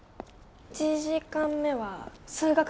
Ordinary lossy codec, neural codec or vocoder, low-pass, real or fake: none; none; none; real